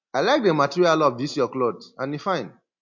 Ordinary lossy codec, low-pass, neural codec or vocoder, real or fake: MP3, 48 kbps; 7.2 kHz; none; real